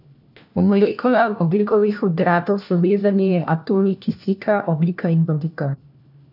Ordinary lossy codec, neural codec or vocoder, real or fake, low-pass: none; codec, 16 kHz, 1 kbps, FunCodec, trained on LibriTTS, 50 frames a second; fake; 5.4 kHz